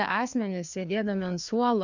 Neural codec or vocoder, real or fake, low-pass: codec, 16 kHz, 2 kbps, FreqCodec, larger model; fake; 7.2 kHz